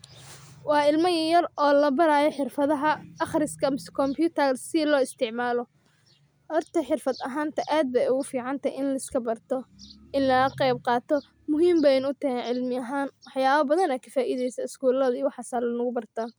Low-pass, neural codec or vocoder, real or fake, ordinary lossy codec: none; none; real; none